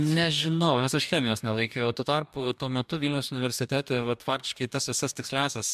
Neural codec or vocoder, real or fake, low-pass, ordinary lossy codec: codec, 44.1 kHz, 2.6 kbps, DAC; fake; 14.4 kHz; MP3, 96 kbps